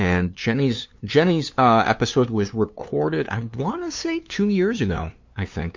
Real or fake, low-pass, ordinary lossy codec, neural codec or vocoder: fake; 7.2 kHz; MP3, 48 kbps; codec, 16 kHz, 2 kbps, FunCodec, trained on LibriTTS, 25 frames a second